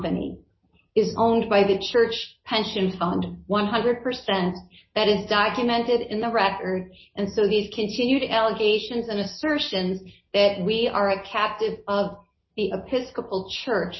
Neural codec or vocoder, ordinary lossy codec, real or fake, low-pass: none; MP3, 24 kbps; real; 7.2 kHz